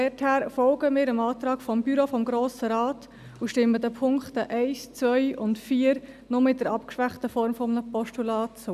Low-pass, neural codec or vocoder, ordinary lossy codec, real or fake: 14.4 kHz; none; none; real